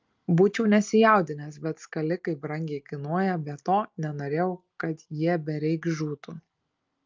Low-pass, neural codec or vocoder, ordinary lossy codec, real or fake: 7.2 kHz; none; Opus, 24 kbps; real